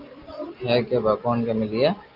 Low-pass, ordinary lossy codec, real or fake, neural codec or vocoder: 5.4 kHz; Opus, 24 kbps; real; none